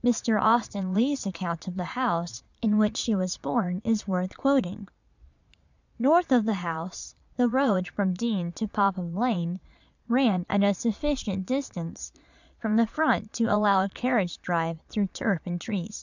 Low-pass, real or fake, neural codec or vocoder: 7.2 kHz; fake; vocoder, 22.05 kHz, 80 mel bands, Vocos